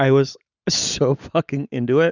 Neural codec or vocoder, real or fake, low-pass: none; real; 7.2 kHz